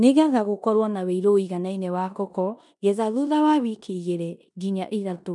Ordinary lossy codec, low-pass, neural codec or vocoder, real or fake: none; 10.8 kHz; codec, 16 kHz in and 24 kHz out, 0.9 kbps, LongCat-Audio-Codec, four codebook decoder; fake